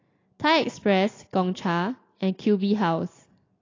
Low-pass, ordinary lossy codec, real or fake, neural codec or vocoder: 7.2 kHz; AAC, 32 kbps; real; none